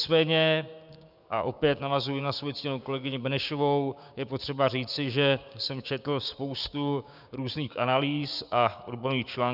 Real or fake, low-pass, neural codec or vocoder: fake; 5.4 kHz; codec, 16 kHz, 6 kbps, DAC